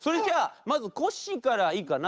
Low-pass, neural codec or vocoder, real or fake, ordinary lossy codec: none; codec, 16 kHz, 8 kbps, FunCodec, trained on Chinese and English, 25 frames a second; fake; none